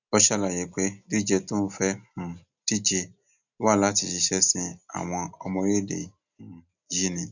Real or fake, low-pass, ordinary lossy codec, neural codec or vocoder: real; 7.2 kHz; none; none